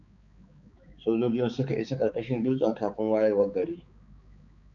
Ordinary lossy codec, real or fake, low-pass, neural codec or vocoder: MP3, 96 kbps; fake; 7.2 kHz; codec, 16 kHz, 4 kbps, X-Codec, HuBERT features, trained on balanced general audio